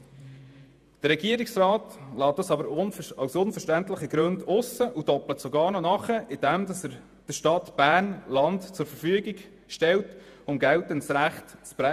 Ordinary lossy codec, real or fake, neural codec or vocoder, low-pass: none; fake; vocoder, 48 kHz, 128 mel bands, Vocos; 14.4 kHz